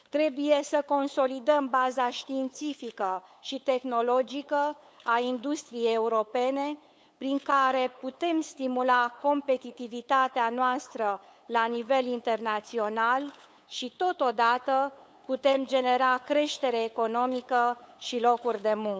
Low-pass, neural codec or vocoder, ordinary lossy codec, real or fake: none; codec, 16 kHz, 8 kbps, FunCodec, trained on LibriTTS, 25 frames a second; none; fake